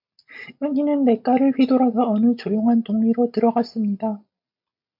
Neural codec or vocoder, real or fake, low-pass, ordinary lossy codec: none; real; 5.4 kHz; MP3, 48 kbps